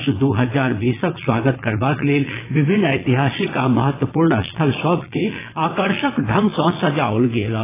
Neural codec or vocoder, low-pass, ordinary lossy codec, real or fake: vocoder, 22.05 kHz, 80 mel bands, Vocos; 3.6 kHz; AAC, 16 kbps; fake